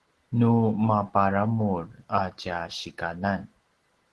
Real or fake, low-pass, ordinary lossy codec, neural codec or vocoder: real; 10.8 kHz; Opus, 16 kbps; none